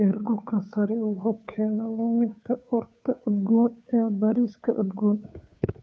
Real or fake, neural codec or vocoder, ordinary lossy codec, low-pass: fake; codec, 16 kHz, 2 kbps, FunCodec, trained on Chinese and English, 25 frames a second; none; none